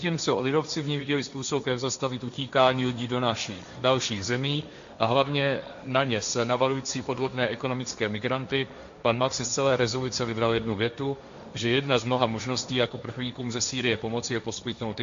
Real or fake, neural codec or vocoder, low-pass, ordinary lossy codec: fake; codec, 16 kHz, 1.1 kbps, Voila-Tokenizer; 7.2 kHz; MP3, 64 kbps